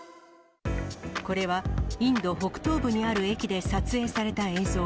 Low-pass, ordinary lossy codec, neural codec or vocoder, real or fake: none; none; none; real